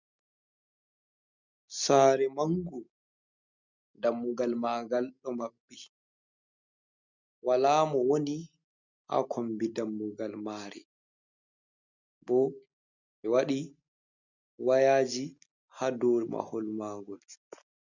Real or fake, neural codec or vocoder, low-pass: real; none; 7.2 kHz